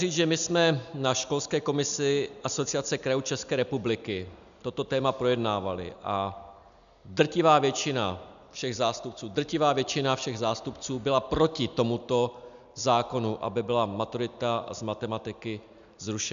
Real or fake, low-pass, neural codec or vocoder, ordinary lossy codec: real; 7.2 kHz; none; MP3, 96 kbps